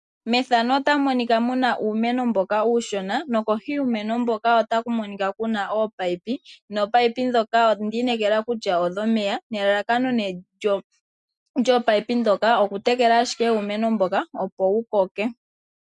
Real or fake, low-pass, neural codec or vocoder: real; 10.8 kHz; none